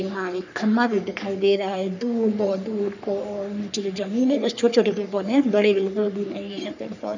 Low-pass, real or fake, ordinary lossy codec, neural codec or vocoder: 7.2 kHz; fake; none; codec, 44.1 kHz, 3.4 kbps, Pupu-Codec